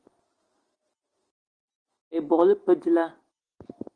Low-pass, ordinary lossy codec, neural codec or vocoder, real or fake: 9.9 kHz; Opus, 24 kbps; none; real